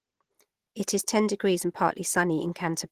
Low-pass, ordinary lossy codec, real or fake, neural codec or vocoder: 14.4 kHz; Opus, 16 kbps; real; none